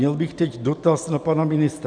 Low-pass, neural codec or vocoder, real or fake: 9.9 kHz; none; real